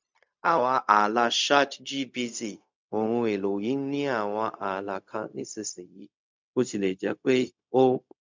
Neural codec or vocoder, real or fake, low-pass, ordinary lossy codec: codec, 16 kHz, 0.4 kbps, LongCat-Audio-Codec; fake; 7.2 kHz; MP3, 64 kbps